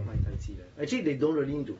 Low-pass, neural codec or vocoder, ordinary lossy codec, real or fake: 19.8 kHz; none; AAC, 24 kbps; real